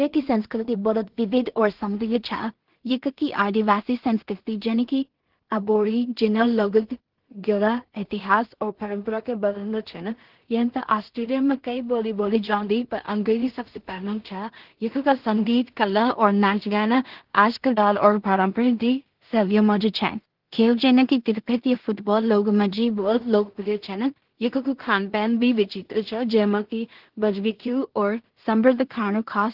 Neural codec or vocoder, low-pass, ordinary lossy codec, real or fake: codec, 16 kHz in and 24 kHz out, 0.4 kbps, LongCat-Audio-Codec, two codebook decoder; 5.4 kHz; Opus, 16 kbps; fake